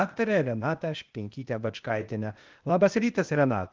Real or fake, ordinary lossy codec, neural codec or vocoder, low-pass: fake; Opus, 32 kbps; codec, 16 kHz, 0.8 kbps, ZipCodec; 7.2 kHz